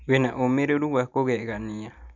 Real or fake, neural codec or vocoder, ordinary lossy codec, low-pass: real; none; none; 7.2 kHz